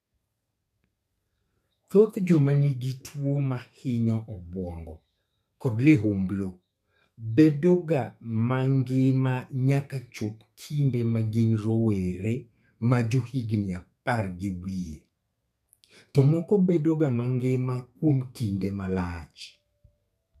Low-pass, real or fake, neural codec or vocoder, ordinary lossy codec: 14.4 kHz; fake; codec, 32 kHz, 1.9 kbps, SNAC; none